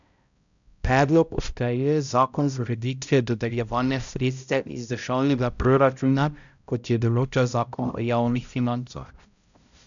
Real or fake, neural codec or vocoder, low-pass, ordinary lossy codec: fake; codec, 16 kHz, 0.5 kbps, X-Codec, HuBERT features, trained on balanced general audio; 7.2 kHz; none